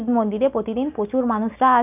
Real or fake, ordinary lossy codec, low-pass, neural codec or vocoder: real; none; 3.6 kHz; none